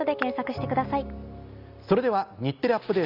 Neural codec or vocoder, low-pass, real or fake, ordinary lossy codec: none; 5.4 kHz; real; none